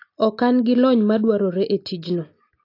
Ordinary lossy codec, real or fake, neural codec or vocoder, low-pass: AAC, 32 kbps; real; none; 5.4 kHz